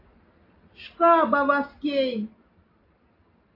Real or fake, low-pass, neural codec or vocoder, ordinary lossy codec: real; 5.4 kHz; none; AAC, 24 kbps